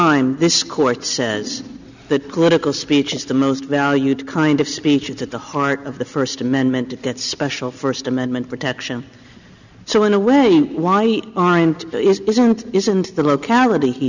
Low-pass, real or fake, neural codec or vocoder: 7.2 kHz; real; none